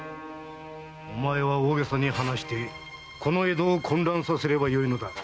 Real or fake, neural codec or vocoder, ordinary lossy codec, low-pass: real; none; none; none